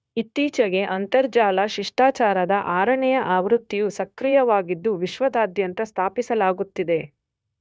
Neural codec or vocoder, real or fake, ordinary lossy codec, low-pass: codec, 16 kHz, 0.9 kbps, LongCat-Audio-Codec; fake; none; none